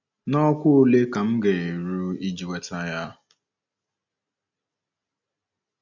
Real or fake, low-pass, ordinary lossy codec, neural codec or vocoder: real; 7.2 kHz; none; none